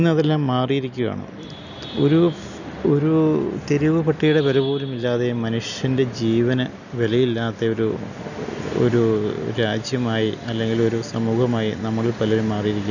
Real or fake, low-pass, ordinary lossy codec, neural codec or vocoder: real; 7.2 kHz; none; none